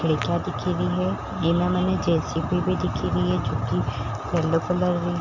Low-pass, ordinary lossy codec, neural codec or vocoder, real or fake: 7.2 kHz; none; none; real